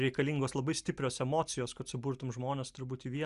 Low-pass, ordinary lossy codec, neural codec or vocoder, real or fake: 10.8 kHz; Opus, 64 kbps; none; real